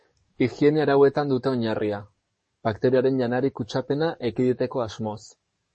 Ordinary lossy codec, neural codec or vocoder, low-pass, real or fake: MP3, 32 kbps; codec, 44.1 kHz, 7.8 kbps, DAC; 10.8 kHz; fake